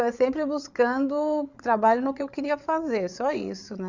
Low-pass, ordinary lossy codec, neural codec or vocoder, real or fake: 7.2 kHz; none; codec, 16 kHz, 8 kbps, FreqCodec, larger model; fake